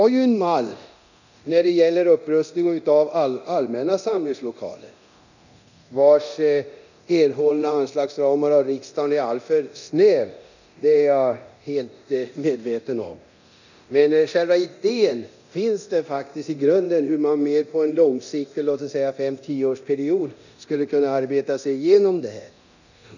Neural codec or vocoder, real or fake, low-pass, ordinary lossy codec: codec, 24 kHz, 0.9 kbps, DualCodec; fake; 7.2 kHz; none